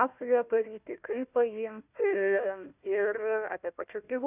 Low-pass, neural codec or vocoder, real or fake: 3.6 kHz; codec, 16 kHz, 1 kbps, FunCodec, trained on Chinese and English, 50 frames a second; fake